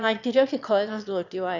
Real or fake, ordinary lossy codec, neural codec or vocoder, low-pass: fake; none; autoencoder, 22.05 kHz, a latent of 192 numbers a frame, VITS, trained on one speaker; 7.2 kHz